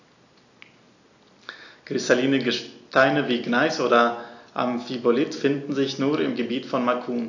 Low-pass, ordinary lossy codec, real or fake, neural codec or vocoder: 7.2 kHz; AAC, 48 kbps; real; none